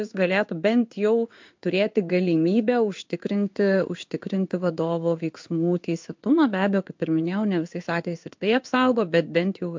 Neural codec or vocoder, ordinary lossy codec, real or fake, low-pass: codec, 16 kHz in and 24 kHz out, 1 kbps, XY-Tokenizer; MP3, 64 kbps; fake; 7.2 kHz